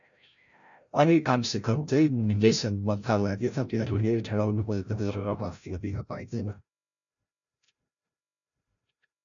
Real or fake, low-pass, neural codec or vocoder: fake; 7.2 kHz; codec, 16 kHz, 0.5 kbps, FreqCodec, larger model